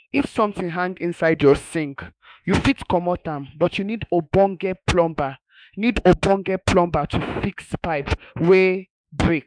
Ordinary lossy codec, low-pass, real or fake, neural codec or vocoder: MP3, 96 kbps; 9.9 kHz; fake; autoencoder, 48 kHz, 32 numbers a frame, DAC-VAE, trained on Japanese speech